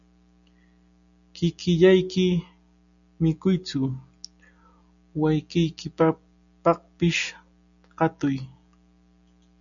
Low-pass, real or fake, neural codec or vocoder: 7.2 kHz; real; none